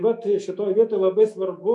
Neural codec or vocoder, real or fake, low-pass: autoencoder, 48 kHz, 128 numbers a frame, DAC-VAE, trained on Japanese speech; fake; 10.8 kHz